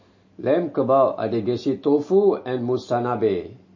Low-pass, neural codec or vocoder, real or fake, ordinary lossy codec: 7.2 kHz; none; real; MP3, 32 kbps